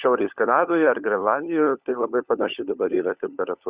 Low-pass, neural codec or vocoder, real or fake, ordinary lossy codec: 3.6 kHz; codec, 16 kHz, 16 kbps, FunCodec, trained on LibriTTS, 50 frames a second; fake; Opus, 64 kbps